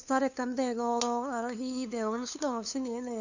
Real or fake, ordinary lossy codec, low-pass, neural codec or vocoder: fake; none; 7.2 kHz; codec, 16 kHz, 2 kbps, FunCodec, trained on LibriTTS, 25 frames a second